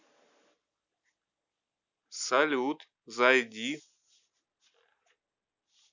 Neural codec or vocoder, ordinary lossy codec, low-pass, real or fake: none; none; 7.2 kHz; real